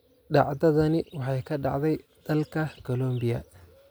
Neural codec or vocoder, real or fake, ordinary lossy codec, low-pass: none; real; none; none